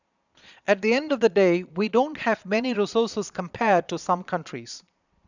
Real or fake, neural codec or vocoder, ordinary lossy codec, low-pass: fake; vocoder, 22.05 kHz, 80 mel bands, Vocos; none; 7.2 kHz